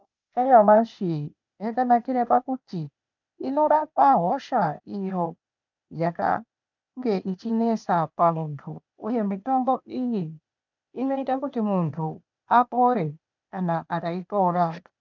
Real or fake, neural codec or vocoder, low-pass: fake; codec, 16 kHz, 0.8 kbps, ZipCodec; 7.2 kHz